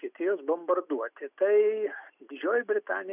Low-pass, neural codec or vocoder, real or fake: 3.6 kHz; none; real